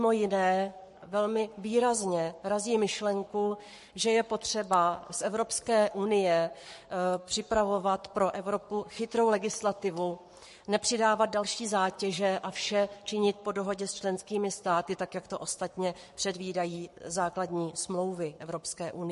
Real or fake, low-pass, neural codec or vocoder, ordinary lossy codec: fake; 14.4 kHz; codec, 44.1 kHz, 7.8 kbps, Pupu-Codec; MP3, 48 kbps